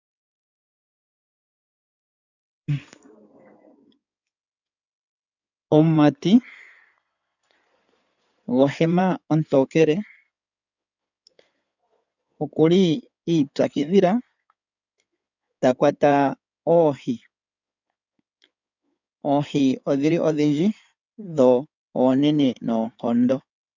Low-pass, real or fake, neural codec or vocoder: 7.2 kHz; fake; codec, 16 kHz in and 24 kHz out, 2.2 kbps, FireRedTTS-2 codec